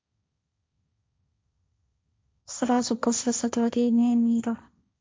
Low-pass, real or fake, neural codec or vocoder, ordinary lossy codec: none; fake; codec, 16 kHz, 1.1 kbps, Voila-Tokenizer; none